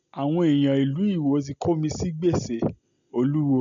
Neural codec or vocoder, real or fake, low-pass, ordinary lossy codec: none; real; 7.2 kHz; none